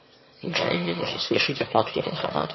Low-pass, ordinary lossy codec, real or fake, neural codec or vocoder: 7.2 kHz; MP3, 24 kbps; fake; autoencoder, 22.05 kHz, a latent of 192 numbers a frame, VITS, trained on one speaker